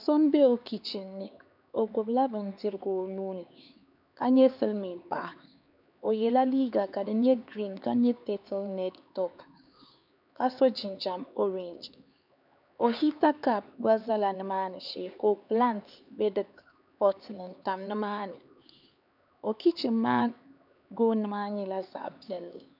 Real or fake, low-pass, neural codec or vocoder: fake; 5.4 kHz; codec, 16 kHz, 4 kbps, X-Codec, HuBERT features, trained on LibriSpeech